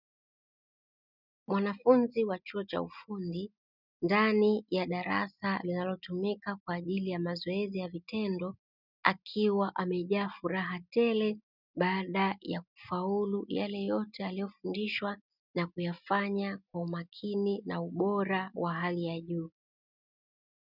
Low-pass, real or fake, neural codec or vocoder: 5.4 kHz; real; none